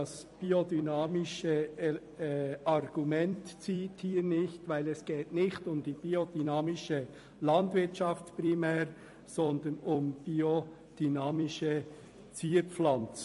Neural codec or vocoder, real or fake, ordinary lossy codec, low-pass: vocoder, 44.1 kHz, 128 mel bands every 256 samples, BigVGAN v2; fake; MP3, 48 kbps; 14.4 kHz